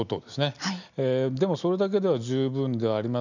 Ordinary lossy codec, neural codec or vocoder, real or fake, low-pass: none; none; real; 7.2 kHz